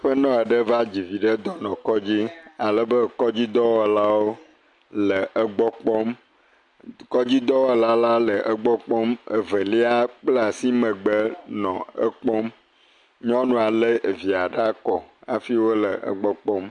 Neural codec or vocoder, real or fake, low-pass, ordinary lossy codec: none; real; 10.8 kHz; MP3, 64 kbps